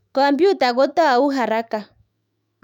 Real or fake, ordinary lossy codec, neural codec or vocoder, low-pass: fake; none; autoencoder, 48 kHz, 128 numbers a frame, DAC-VAE, trained on Japanese speech; 19.8 kHz